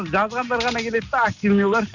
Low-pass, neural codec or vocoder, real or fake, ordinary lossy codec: 7.2 kHz; none; real; none